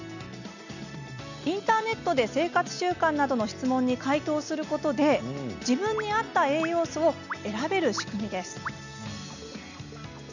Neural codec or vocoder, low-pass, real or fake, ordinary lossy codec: none; 7.2 kHz; real; none